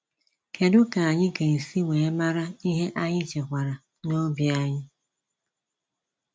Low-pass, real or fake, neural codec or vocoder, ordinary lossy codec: none; real; none; none